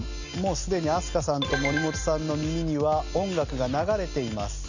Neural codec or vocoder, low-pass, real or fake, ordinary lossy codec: none; 7.2 kHz; real; none